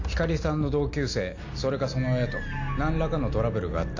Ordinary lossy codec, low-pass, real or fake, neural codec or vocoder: AAC, 48 kbps; 7.2 kHz; real; none